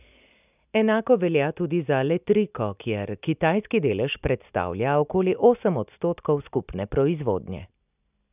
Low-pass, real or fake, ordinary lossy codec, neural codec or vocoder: 3.6 kHz; real; none; none